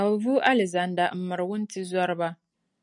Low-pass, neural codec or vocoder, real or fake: 10.8 kHz; none; real